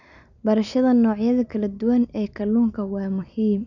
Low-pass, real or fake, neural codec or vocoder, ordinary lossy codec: 7.2 kHz; real; none; none